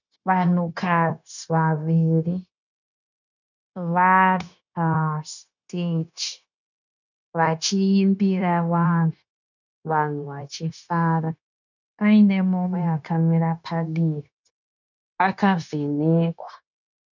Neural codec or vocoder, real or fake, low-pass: codec, 16 kHz, 0.9 kbps, LongCat-Audio-Codec; fake; 7.2 kHz